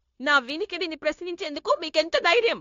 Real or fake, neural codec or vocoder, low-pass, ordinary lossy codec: fake; codec, 16 kHz, 0.9 kbps, LongCat-Audio-Codec; 7.2 kHz; AAC, 48 kbps